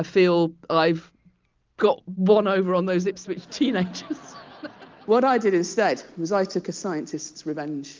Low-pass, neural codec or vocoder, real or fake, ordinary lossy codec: 7.2 kHz; none; real; Opus, 32 kbps